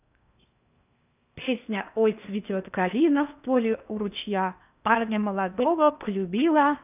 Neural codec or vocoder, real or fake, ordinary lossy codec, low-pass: codec, 16 kHz in and 24 kHz out, 0.6 kbps, FocalCodec, streaming, 4096 codes; fake; none; 3.6 kHz